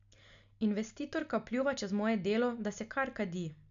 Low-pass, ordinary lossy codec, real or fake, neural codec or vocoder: 7.2 kHz; none; real; none